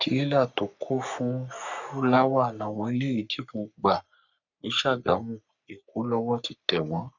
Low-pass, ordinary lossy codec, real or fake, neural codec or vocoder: 7.2 kHz; none; fake; codec, 44.1 kHz, 3.4 kbps, Pupu-Codec